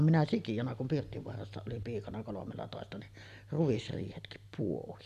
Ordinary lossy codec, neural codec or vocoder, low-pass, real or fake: none; none; 14.4 kHz; real